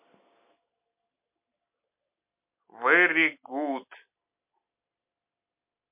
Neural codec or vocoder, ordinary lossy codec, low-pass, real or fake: none; MP3, 32 kbps; 3.6 kHz; real